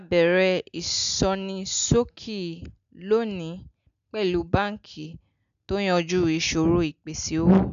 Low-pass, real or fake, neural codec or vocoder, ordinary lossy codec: 7.2 kHz; real; none; none